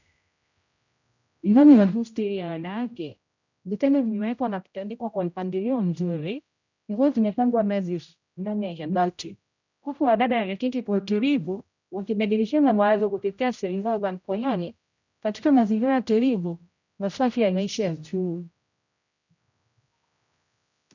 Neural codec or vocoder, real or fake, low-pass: codec, 16 kHz, 0.5 kbps, X-Codec, HuBERT features, trained on general audio; fake; 7.2 kHz